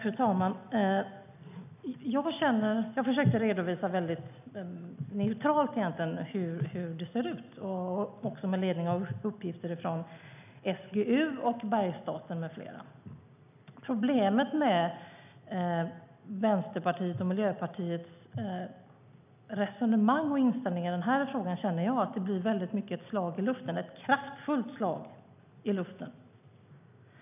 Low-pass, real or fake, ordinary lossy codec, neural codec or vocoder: 3.6 kHz; real; none; none